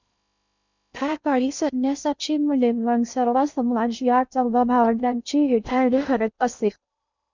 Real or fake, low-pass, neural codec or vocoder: fake; 7.2 kHz; codec, 16 kHz in and 24 kHz out, 0.6 kbps, FocalCodec, streaming, 2048 codes